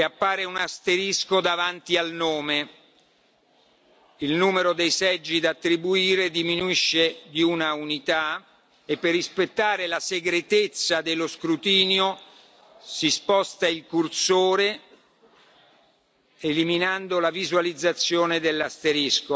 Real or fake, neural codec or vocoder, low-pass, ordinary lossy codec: real; none; none; none